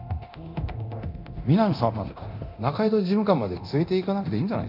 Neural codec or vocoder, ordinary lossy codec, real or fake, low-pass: codec, 24 kHz, 0.9 kbps, DualCodec; none; fake; 5.4 kHz